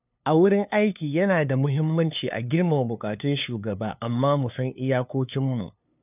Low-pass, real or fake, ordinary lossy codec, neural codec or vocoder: 3.6 kHz; fake; none; codec, 16 kHz, 2 kbps, FunCodec, trained on LibriTTS, 25 frames a second